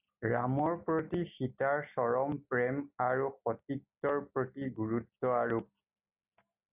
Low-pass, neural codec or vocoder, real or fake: 3.6 kHz; none; real